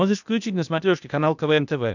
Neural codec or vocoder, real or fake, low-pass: codec, 16 kHz, 0.8 kbps, ZipCodec; fake; 7.2 kHz